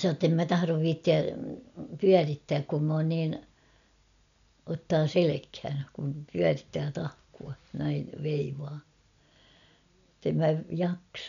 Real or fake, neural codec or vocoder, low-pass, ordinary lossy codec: real; none; 7.2 kHz; none